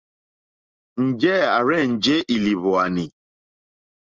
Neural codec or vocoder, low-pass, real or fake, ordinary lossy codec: none; 7.2 kHz; real; Opus, 24 kbps